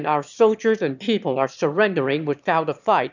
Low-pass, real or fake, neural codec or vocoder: 7.2 kHz; fake; autoencoder, 22.05 kHz, a latent of 192 numbers a frame, VITS, trained on one speaker